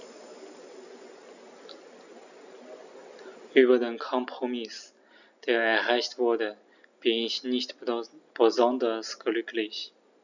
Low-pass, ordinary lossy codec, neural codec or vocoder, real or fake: 7.2 kHz; none; none; real